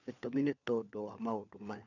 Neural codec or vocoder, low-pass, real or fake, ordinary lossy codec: codec, 16 kHz, 4 kbps, FunCodec, trained on LibriTTS, 50 frames a second; 7.2 kHz; fake; none